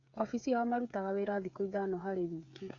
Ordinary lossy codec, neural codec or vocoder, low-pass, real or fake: AAC, 48 kbps; codec, 16 kHz, 8 kbps, FreqCodec, smaller model; 7.2 kHz; fake